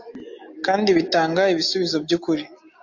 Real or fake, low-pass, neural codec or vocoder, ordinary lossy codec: real; 7.2 kHz; none; AAC, 48 kbps